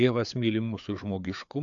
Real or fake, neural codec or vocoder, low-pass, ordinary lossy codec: fake; codec, 16 kHz, 4 kbps, FreqCodec, larger model; 7.2 kHz; AAC, 64 kbps